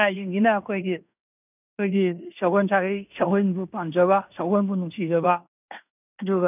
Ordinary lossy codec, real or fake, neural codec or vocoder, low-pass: none; fake; codec, 16 kHz in and 24 kHz out, 0.9 kbps, LongCat-Audio-Codec, fine tuned four codebook decoder; 3.6 kHz